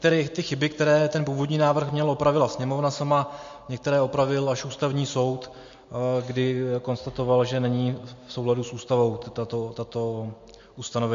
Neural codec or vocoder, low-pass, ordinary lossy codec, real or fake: none; 7.2 kHz; MP3, 48 kbps; real